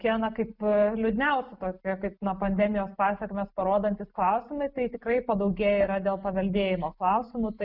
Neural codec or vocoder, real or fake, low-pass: none; real; 5.4 kHz